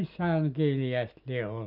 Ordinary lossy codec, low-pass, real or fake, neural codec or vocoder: none; 5.4 kHz; real; none